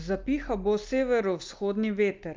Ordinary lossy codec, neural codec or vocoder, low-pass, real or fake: Opus, 24 kbps; none; 7.2 kHz; real